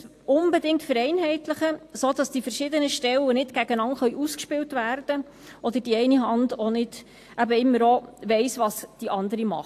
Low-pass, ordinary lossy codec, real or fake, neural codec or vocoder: 14.4 kHz; AAC, 64 kbps; real; none